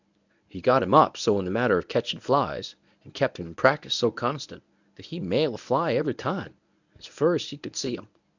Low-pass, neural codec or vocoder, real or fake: 7.2 kHz; codec, 24 kHz, 0.9 kbps, WavTokenizer, medium speech release version 1; fake